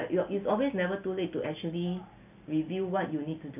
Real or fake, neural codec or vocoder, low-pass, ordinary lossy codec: real; none; 3.6 kHz; none